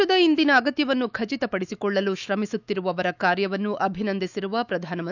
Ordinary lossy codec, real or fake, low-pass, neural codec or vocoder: none; fake; 7.2 kHz; autoencoder, 48 kHz, 128 numbers a frame, DAC-VAE, trained on Japanese speech